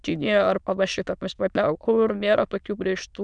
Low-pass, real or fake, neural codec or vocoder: 9.9 kHz; fake; autoencoder, 22.05 kHz, a latent of 192 numbers a frame, VITS, trained on many speakers